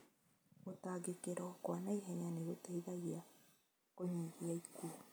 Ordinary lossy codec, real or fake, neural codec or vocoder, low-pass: none; real; none; none